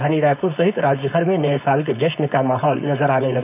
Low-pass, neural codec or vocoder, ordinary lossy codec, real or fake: 3.6 kHz; codec, 16 kHz, 4.8 kbps, FACodec; none; fake